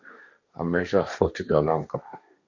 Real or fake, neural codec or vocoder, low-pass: fake; codec, 16 kHz, 1.1 kbps, Voila-Tokenizer; 7.2 kHz